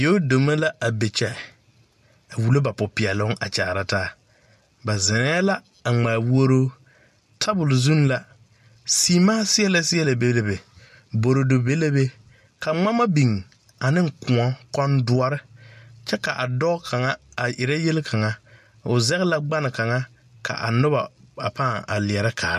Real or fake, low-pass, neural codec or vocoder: real; 14.4 kHz; none